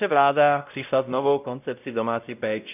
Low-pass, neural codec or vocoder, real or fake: 3.6 kHz; codec, 16 kHz, 0.5 kbps, X-Codec, WavLM features, trained on Multilingual LibriSpeech; fake